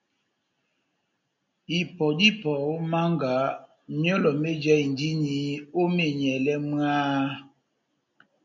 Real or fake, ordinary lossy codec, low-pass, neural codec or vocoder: real; MP3, 48 kbps; 7.2 kHz; none